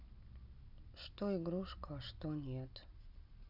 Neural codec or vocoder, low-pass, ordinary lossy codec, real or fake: none; 5.4 kHz; none; real